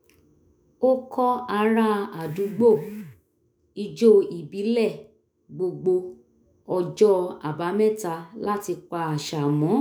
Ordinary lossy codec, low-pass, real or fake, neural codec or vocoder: none; none; fake; autoencoder, 48 kHz, 128 numbers a frame, DAC-VAE, trained on Japanese speech